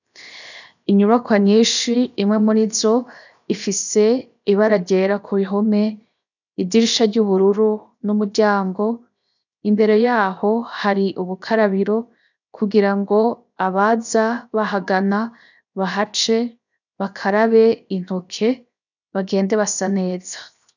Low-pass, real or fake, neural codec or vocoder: 7.2 kHz; fake; codec, 16 kHz, 0.7 kbps, FocalCodec